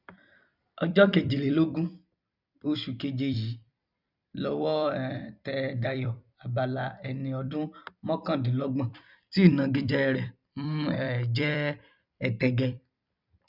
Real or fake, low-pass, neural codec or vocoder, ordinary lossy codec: fake; 5.4 kHz; vocoder, 44.1 kHz, 128 mel bands every 256 samples, BigVGAN v2; none